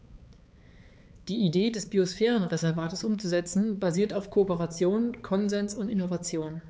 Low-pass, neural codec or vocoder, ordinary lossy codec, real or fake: none; codec, 16 kHz, 4 kbps, X-Codec, HuBERT features, trained on balanced general audio; none; fake